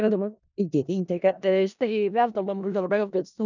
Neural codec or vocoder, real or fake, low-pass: codec, 16 kHz in and 24 kHz out, 0.4 kbps, LongCat-Audio-Codec, four codebook decoder; fake; 7.2 kHz